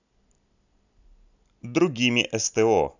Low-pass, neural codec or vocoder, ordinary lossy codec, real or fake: 7.2 kHz; none; none; real